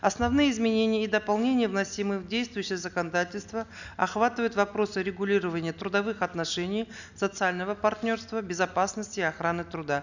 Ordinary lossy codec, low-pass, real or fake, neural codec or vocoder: none; 7.2 kHz; real; none